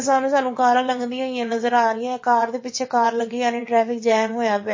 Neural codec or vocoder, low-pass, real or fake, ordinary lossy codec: vocoder, 22.05 kHz, 80 mel bands, WaveNeXt; 7.2 kHz; fake; MP3, 32 kbps